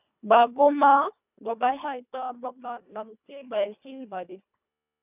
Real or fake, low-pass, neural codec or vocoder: fake; 3.6 kHz; codec, 24 kHz, 1.5 kbps, HILCodec